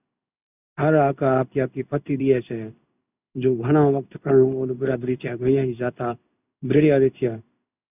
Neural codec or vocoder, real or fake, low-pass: codec, 16 kHz in and 24 kHz out, 1 kbps, XY-Tokenizer; fake; 3.6 kHz